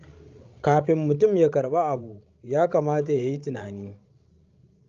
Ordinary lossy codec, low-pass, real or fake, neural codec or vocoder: Opus, 32 kbps; 7.2 kHz; fake; codec, 16 kHz, 16 kbps, FreqCodec, larger model